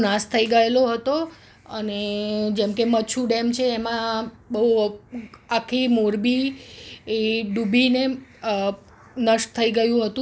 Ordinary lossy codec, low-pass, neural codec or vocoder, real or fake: none; none; none; real